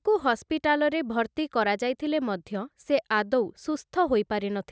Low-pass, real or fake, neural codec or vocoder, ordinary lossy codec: none; real; none; none